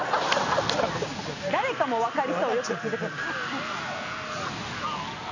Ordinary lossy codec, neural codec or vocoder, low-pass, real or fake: none; none; 7.2 kHz; real